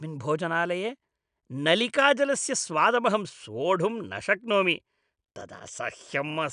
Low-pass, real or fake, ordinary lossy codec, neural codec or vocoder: 9.9 kHz; real; none; none